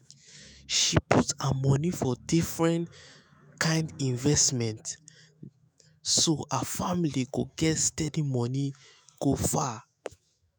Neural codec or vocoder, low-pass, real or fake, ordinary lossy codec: autoencoder, 48 kHz, 128 numbers a frame, DAC-VAE, trained on Japanese speech; none; fake; none